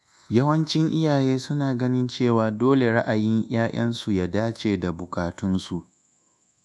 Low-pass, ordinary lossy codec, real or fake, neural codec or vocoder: none; none; fake; codec, 24 kHz, 1.2 kbps, DualCodec